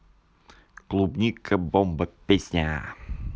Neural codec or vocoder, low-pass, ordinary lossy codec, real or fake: none; none; none; real